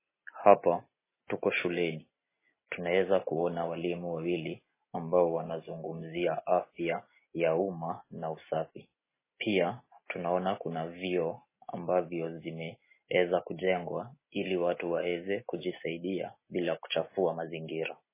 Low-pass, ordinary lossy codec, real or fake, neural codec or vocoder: 3.6 kHz; MP3, 16 kbps; real; none